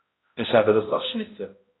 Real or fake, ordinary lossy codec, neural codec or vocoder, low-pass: fake; AAC, 16 kbps; codec, 16 kHz, 0.5 kbps, X-Codec, HuBERT features, trained on balanced general audio; 7.2 kHz